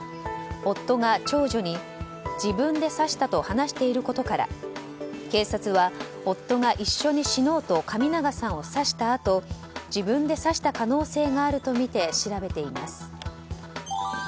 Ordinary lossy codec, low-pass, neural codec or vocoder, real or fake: none; none; none; real